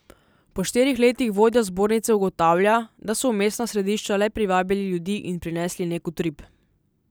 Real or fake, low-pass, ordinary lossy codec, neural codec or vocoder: real; none; none; none